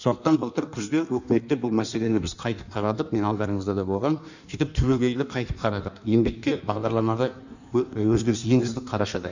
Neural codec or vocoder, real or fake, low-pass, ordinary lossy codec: codec, 16 kHz in and 24 kHz out, 1.1 kbps, FireRedTTS-2 codec; fake; 7.2 kHz; none